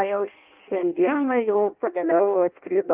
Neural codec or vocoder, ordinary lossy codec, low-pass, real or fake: codec, 16 kHz in and 24 kHz out, 0.6 kbps, FireRedTTS-2 codec; Opus, 32 kbps; 3.6 kHz; fake